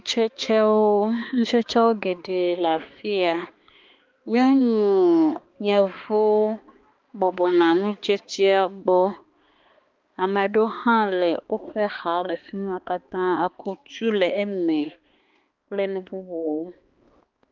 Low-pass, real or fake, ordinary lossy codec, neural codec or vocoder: 7.2 kHz; fake; Opus, 32 kbps; codec, 16 kHz, 2 kbps, X-Codec, HuBERT features, trained on balanced general audio